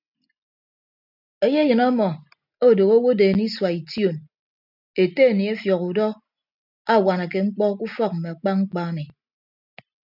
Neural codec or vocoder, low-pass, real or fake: none; 5.4 kHz; real